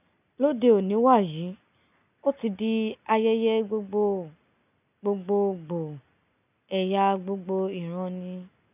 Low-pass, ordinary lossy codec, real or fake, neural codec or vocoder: 3.6 kHz; none; real; none